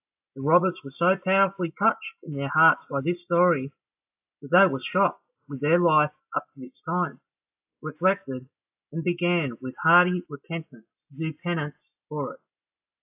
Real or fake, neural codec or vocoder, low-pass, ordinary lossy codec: real; none; 3.6 kHz; AAC, 32 kbps